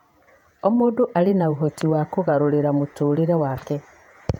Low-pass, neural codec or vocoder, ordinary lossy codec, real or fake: 19.8 kHz; vocoder, 48 kHz, 128 mel bands, Vocos; none; fake